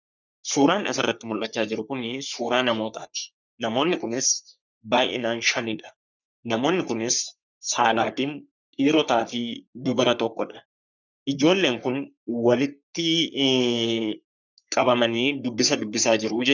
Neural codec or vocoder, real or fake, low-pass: codec, 44.1 kHz, 3.4 kbps, Pupu-Codec; fake; 7.2 kHz